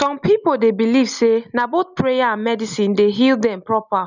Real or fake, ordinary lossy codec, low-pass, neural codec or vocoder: real; none; 7.2 kHz; none